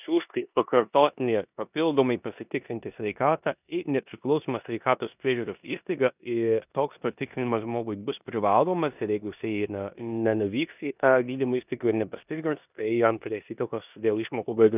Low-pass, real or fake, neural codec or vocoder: 3.6 kHz; fake; codec, 16 kHz in and 24 kHz out, 0.9 kbps, LongCat-Audio-Codec, four codebook decoder